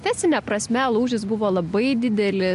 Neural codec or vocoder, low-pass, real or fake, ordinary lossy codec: none; 10.8 kHz; real; MP3, 64 kbps